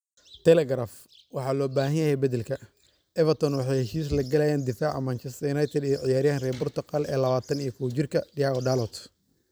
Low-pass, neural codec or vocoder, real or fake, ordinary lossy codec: none; vocoder, 44.1 kHz, 128 mel bands every 256 samples, BigVGAN v2; fake; none